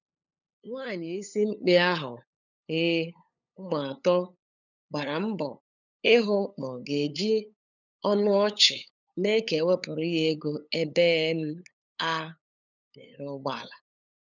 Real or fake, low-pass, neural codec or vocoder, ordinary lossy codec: fake; 7.2 kHz; codec, 16 kHz, 8 kbps, FunCodec, trained on LibriTTS, 25 frames a second; none